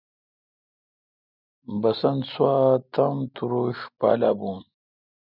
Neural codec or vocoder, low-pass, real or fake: none; 5.4 kHz; real